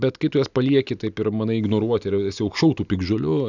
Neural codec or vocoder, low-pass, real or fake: none; 7.2 kHz; real